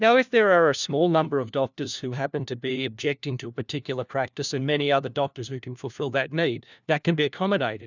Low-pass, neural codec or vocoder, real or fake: 7.2 kHz; codec, 16 kHz, 1 kbps, FunCodec, trained on LibriTTS, 50 frames a second; fake